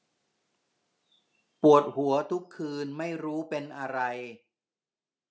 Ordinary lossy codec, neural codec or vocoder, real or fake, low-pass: none; none; real; none